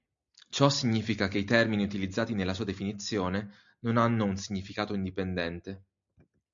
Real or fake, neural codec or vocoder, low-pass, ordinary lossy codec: real; none; 7.2 kHz; MP3, 64 kbps